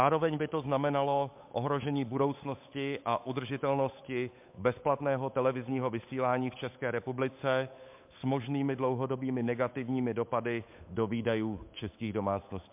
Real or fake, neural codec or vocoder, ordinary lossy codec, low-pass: fake; codec, 16 kHz, 8 kbps, FunCodec, trained on Chinese and English, 25 frames a second; MP3, 32 kbps; 3.6 kHz